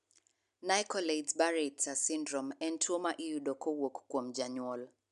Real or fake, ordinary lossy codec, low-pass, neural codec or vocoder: real; none; 10.8 kHz; none